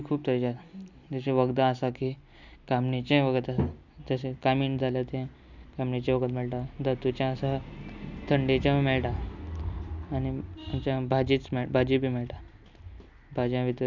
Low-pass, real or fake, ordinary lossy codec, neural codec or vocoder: 7.2 kHz; real; none; none